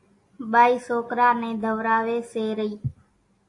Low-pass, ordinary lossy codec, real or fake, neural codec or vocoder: 10.8 kHz; AAC, 48 kbps; real; none